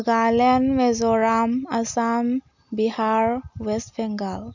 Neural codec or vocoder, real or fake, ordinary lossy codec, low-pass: none; real; none; 7.2 kHz